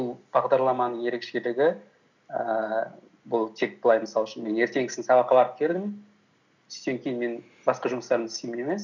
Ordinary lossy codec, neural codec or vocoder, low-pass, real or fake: none; none; 7.2 kHz; real